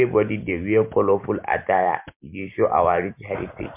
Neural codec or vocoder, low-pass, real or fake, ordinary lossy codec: none; 3.6 kHz; real; MP3, 32 kbps